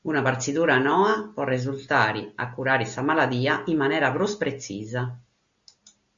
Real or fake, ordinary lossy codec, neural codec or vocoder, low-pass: real; Opus, 64 kbps; none; 7.2 kHz